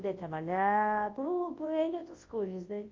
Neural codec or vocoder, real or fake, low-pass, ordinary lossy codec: codec, 24 kHz, 0.9 kbps, WavTokenizer, large speech release; fake; 7.2 kHz; Opus, 32 kbps